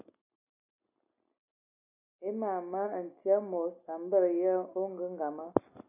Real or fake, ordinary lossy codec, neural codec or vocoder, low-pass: real; AAC, 24 kbps; none; 3.6 kHz